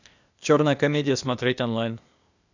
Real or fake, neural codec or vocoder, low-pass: fake; codec, 16 kHz, 0.8 kbps, ZipCodec; 7.2 kHz